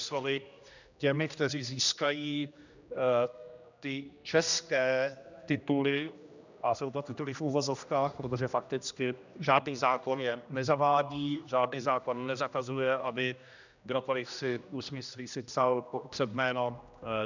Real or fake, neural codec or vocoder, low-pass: fake; codec, 16 kHz, 1 kbps, X-Codec, HuBERT features, trained on general audio; 7.2 kHz